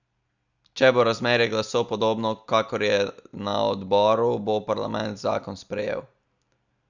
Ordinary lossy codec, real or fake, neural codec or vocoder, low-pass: none; real; none; 7.2 kHz